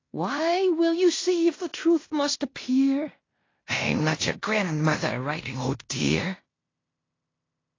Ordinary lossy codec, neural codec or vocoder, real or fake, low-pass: AAC, 32 kbps; codec, 16 kHz in and 24 kHz out, 0.9 kbps, LongCat-Audio-Codec, fine tuned four codebook decoder; fake; 7.2 kHz